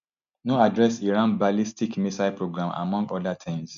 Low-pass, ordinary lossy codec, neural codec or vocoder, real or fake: 7.2 kHz; MP3, 64 kbps; none; real